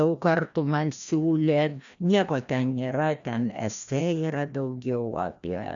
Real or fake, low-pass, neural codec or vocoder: fake; 7.2 kHz; codec, 16 kHz, 1 kbps, FreqCodec, larger model